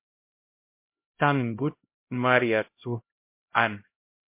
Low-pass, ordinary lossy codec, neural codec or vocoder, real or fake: 3.6 kHz; MP3, 24 kbps; codec, 16 kHz, 0.5 kbps, X-Codec, HuBERT features, trained on LibriSpeech; fake